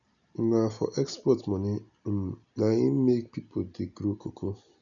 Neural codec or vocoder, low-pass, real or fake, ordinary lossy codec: none; 7.2 kHz; real; none